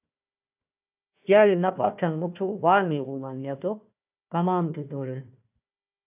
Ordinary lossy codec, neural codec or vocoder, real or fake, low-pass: AAC, 32 kbps; codec, 16 kHz, 1 kbps, FunCodec, trained on Chinese and English, 50 frames a second; fake; 3.6 kHz